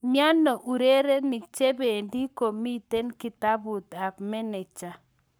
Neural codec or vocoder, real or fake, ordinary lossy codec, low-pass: codec, 44.1 kHz, 7.8 kbps, Pupu-Codec; fake; none; none